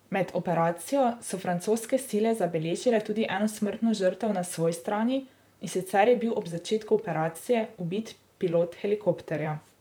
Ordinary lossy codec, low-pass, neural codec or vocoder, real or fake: none; none; vocoder, 44.1 kHz, 128 mel bands, Pupu-Vocoder; fake